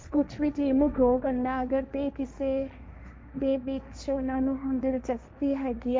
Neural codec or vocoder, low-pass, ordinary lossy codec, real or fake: codec, 16 kHz, 1.1 kbps, Voila-Tokenizer; none; none; fake